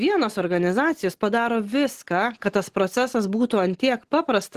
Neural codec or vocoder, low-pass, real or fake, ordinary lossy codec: none; 14.4 kHz; real; Opus, 16 kbps